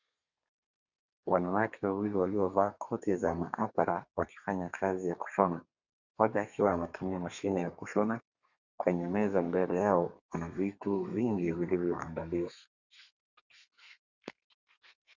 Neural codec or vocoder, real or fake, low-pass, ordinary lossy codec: codec, 32 kHz, 1.9 kbps, SNAC; fake; 7.2 kHz; Opus, 64 kbps